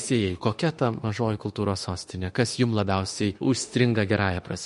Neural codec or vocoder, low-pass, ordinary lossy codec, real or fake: autoencoder, 48 kHz, 32 numbers a frame, DAC-VAE, trained on Japanese speech; 14.4 kHz; MP3, 48 kbps; fake